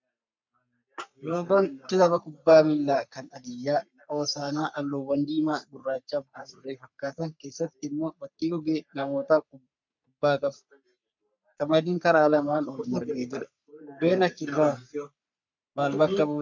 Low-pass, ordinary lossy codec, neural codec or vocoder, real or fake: 7.2 kHz; MP3, 64 kbps; codec, 44.1 kHz, 3.4 kbps, Pupu-Codec; fake